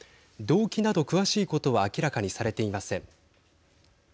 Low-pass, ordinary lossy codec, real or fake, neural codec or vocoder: none; none; real; none